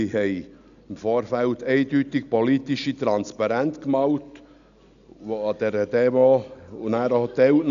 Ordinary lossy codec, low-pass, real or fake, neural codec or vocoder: none; 7.2 kHz; real; none